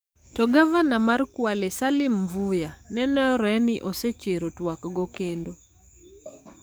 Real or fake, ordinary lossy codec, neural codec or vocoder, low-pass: fake; none; codec, 44.1 kHz, 7.8 kbps, DAC; none